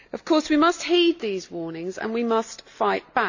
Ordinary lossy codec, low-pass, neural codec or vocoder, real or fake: AAC, 48 kbps; 7.2 kHz; none; real